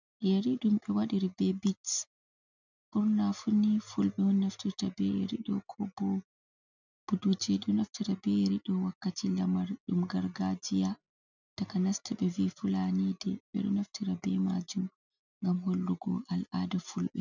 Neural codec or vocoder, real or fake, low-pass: none; real; 7.2 kHz